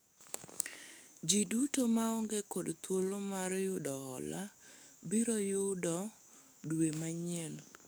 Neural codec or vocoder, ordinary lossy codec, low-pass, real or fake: codec, 44.1 kHz, 7.8 kbps, DAC; none; none; fake